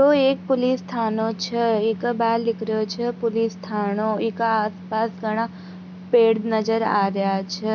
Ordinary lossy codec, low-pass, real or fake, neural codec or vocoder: none; 7.2 kHz; real; none